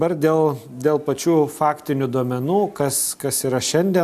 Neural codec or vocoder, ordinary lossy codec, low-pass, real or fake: none; AAC, 96 kbps; 14.4 kHz; real